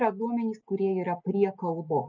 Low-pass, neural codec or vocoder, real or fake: 7.2 kHz; none; real